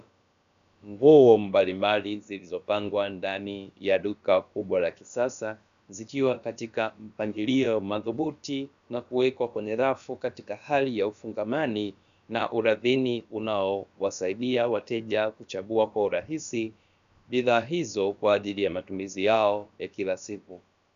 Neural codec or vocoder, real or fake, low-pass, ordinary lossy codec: codec, 16 kHz, about 1 kbps, DyCAST, with the encoder's durations; fake; 7.2 kHz; MP3, 96 kbps